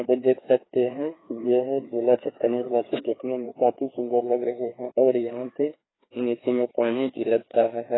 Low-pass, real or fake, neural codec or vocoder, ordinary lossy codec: 7.2 kHz; fake; codec, 16 kHz, 2 kbps, FreqCodec, larger model; AAC, 16 kbps